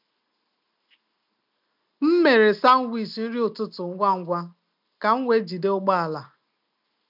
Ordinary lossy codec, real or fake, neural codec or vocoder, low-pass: none; real; none; 5.4 kHz